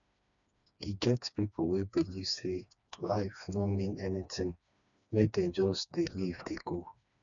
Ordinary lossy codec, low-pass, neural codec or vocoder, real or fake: none; 7.2 kHz; codec, 16 kHz, 2 kbps, FreqCodec, smaller model; fake